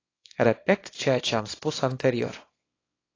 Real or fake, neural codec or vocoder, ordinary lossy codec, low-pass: fake; codec, 24 kHz, 0.9 kbps, WavTokenizer, small release; AAC, 32 kbps; 7.2 kHz